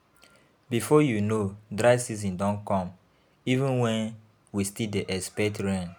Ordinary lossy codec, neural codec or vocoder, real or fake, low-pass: none; none; real; none